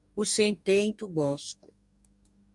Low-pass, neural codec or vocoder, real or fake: 10.8 kHz; codec, 44.1 kHz, 2.6 kbps, DAC; fake